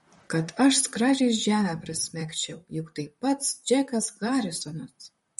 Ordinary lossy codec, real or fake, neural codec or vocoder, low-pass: MP3, 48 kbps; fake; vocoder, 44.1 kHz, 128 mel bands, Pupu-Vocoder; 19.8 kHz